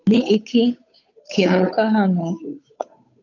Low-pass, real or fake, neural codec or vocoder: 7.2 kHz; fake; codec, 16 kHz, 8 kbps, FunCodec, trained on Chinese and English, 25 frames a second